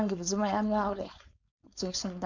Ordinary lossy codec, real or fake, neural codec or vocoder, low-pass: none; fake; codec, 16 kHz, 4.8 kbps, FACodec; 7.2 kHz